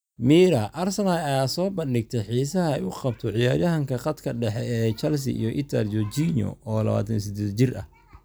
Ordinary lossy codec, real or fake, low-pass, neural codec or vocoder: none; real; none; none